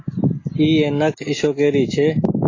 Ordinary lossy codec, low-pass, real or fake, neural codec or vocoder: AAC, 32 kbps; 7.2 kHz; real; none